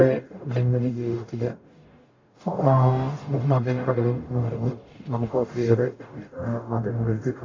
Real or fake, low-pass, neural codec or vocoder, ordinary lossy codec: fake; 7.2 kHz; codec, 44.1 kHz, 0.9 kbps, DAC; AAC, 32 kbps